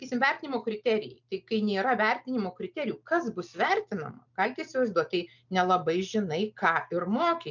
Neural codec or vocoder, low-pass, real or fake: none; 7.2 kHz; real